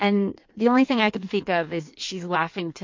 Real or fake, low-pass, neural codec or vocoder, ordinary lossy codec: fake; 7.2 kHz; codec, 16 kHz in and 24 kHz out, 1.1 kbps, FireRedTTS-2 codec; MP3, 48 kbps